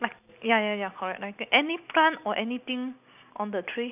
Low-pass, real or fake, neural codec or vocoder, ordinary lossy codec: 3.6 kHz; real; none; none